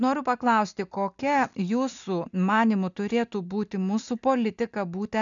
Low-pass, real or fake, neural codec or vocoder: 7.2 kHz; real; none